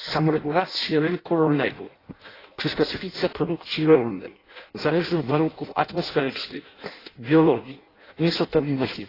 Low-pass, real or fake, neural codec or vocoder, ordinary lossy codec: 5.4 kHz; fake; codec, 16 kHz in and 24 kHz out, 0.6 kbps, FireRedTTS-2 codec; AAC, 24 kbps